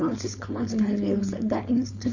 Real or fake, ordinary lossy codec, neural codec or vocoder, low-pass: fake; none; codec, 16 kHz, 4.8 kbps, FACodec; 7.2 kHz